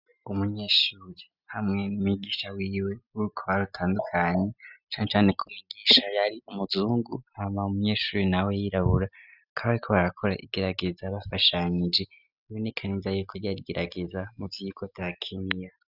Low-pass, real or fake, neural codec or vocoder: 5.4 kHz; real; none